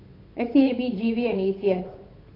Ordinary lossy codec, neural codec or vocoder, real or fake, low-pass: AAC, 24 kbps; codec, 16 kHz, 8 kbps, FunCodec, trained on Chinese and English, 25 frames a second; fake; 5.4 kHz